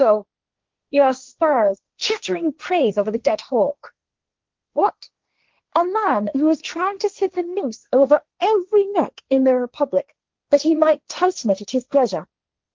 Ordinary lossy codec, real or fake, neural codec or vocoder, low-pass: Opus, 32 kbps; fake; codec, 16 kHz, 1.1 kbps, Voila-Tokenizer; 7.2 kHz